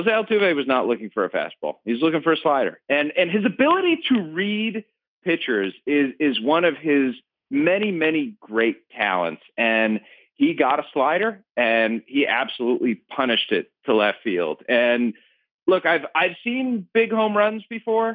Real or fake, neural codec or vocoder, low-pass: real; none; 5.4 kHz